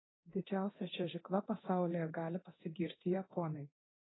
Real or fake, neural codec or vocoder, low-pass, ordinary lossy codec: fake; codec, 24 kHz, 0.9 kbps, DualCodec; 7.2 kHz; AAC, 16 kbps